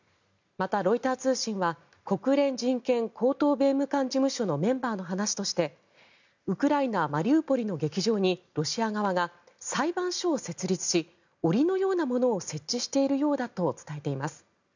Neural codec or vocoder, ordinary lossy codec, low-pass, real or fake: none; none; 7.2 kHz; real